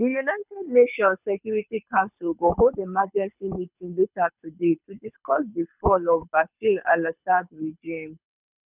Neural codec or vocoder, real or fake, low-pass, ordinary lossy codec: codec, 24 kHz, 6 kbps, HILCodec; fake; 3.6 kHz; none